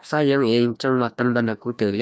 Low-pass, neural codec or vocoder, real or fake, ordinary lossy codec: none; codec, 16 kHz, 1 kbps, FreqCodec, larger model; fake; none